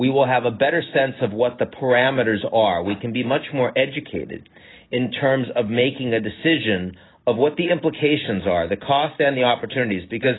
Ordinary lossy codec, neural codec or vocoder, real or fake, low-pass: AAC, 16 kbps; none; real; 7.2 kHz